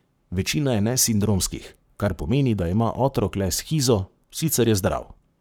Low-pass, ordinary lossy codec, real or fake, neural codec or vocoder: none; none; fake; codec, 44.1 kHz, 7.8 kbps, Pupu-Codec